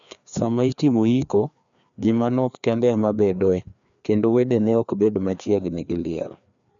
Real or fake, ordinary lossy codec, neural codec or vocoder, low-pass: fake; none; codec, 16 kHz, 2 kbps, FreqCodec, larger model; 7.2 kHz